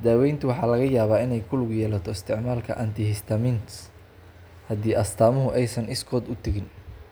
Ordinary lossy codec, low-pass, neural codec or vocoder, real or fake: none; none; none; real